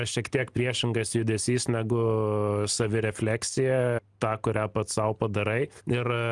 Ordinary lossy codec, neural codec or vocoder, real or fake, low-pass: Opus, 32 kbps; none; real; 10.8 kHz